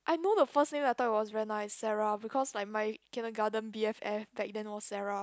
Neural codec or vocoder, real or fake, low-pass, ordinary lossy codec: none; real; none; none